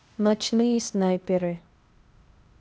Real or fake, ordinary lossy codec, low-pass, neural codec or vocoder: fake; none; none; codec, 16 kHz, 0.8 kbps, ZipCodec